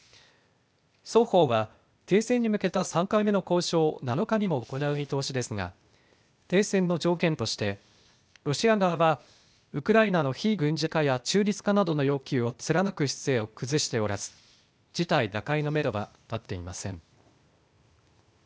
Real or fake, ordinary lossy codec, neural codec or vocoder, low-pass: fake; none; codec, 16 kHz, 0.8 kbps, ZipCodec; none